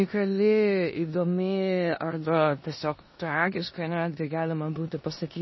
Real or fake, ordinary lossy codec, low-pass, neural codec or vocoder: fake; MP3, 24 kbps; 7.2 kHz; codec, 16 kHz in and 24 kHz out, 0.9 kbps, LongCat-Audio-Codec, four codebook decoder